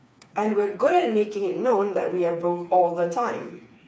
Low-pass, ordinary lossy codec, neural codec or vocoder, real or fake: none; none; codec, 16 kHz, 4 kbps, FreqCodec, smaller model; fake